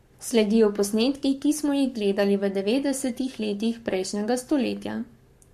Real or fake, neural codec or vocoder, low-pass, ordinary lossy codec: fake; codec, 44.1 kHz, 7.8 kbps, Pupu-Codec; 14.4 kHz; MP3, 64 kbps